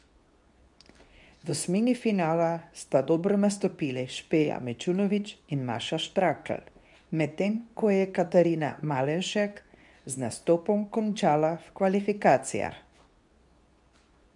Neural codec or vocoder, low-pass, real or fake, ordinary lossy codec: codec, 24 kHz, 0.9 kbps, WavTokenizer, medium speech release version 2; 10.8 kHz; fake; none